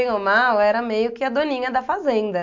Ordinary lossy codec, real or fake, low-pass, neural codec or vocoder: MP3, 64 kbps; real; 7.2 kHz; none